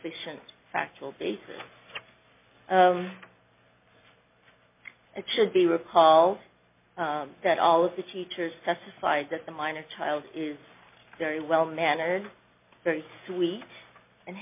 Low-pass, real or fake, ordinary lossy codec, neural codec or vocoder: 3.6 kHz; real; MP3, 32 kbps; none